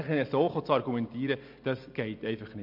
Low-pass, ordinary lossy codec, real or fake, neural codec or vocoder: 5.4 kHz; MP3, 48 kbps; real; none